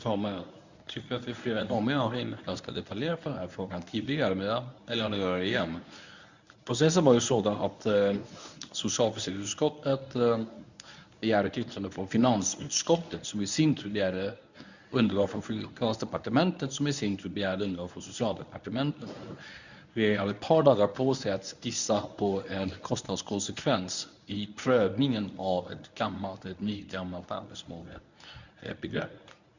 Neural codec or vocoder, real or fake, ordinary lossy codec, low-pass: codec, 24 kHz, 0.9 kbps, WavTokenizer, medium speech release version 1; fake; none; 7.2 kHz